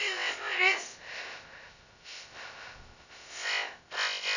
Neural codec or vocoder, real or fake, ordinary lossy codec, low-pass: codec, 16 kHz, 0.2 kbps, FocalCodec; fake; none; 7.2 kHz